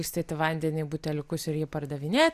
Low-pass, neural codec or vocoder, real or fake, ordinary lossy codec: 14.4 kHz; none; real; Opus, 64 kbps